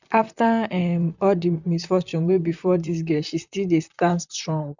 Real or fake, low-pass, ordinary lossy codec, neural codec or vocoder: fake; 7.2 kHz; none; vocoder, 44.1 kHz, 128 mel bands, Pupu-Vocoder